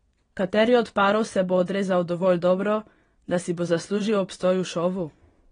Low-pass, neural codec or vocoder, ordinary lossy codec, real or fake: 9.9 kHz; vocoder, 22.05 kHz, 80 mel bands, WaveNeXt; AAC, 32 kbps; fake